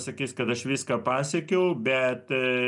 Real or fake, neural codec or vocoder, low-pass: real; none; 10.8 kHz